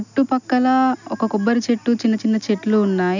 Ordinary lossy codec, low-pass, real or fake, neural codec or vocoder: none; 7.2 kHz; real; none